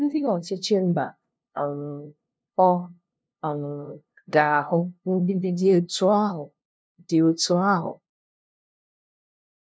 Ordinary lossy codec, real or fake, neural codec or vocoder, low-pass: none; fake; codec, 16 kHz, 0.5 kbps, FunCodec, trained on LibriTTS, 25 frames a second; none